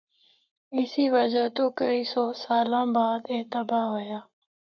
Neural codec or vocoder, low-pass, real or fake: codec, 44.1 kHz, 7.8 kbps, Pupu-Codec; 7.2 kHz; fake